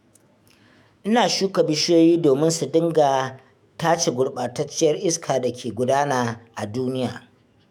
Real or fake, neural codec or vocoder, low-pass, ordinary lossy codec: fake; autoencoder, 48 kHz, 128 numbers a frame, DAC-VAE, trained on Japanese speech; 19.8 kHz; none